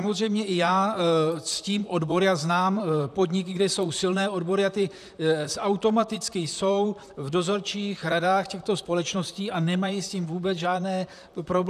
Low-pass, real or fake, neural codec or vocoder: 14.4 kHz; fake; vocoder, 44.1 kHz, 128 mel bands, Pupu-Vocoder